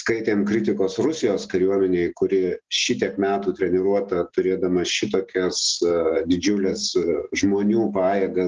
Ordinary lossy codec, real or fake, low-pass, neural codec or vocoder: Opus, 24 kbps; real; 7.2 kHz; none